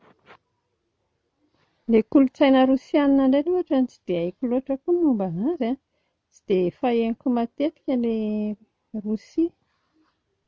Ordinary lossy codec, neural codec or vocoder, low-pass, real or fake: none; none; none; real